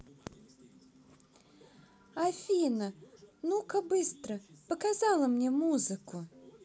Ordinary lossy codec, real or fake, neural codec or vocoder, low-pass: none; real; none; none